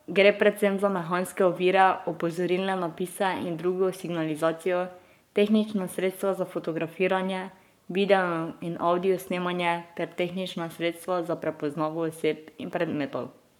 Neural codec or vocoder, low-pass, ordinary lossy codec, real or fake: codec, 44.1 kHz, 7.8 kbps, Pupu-Codec; 19.8 kHz; MP3, 96 kbps; fake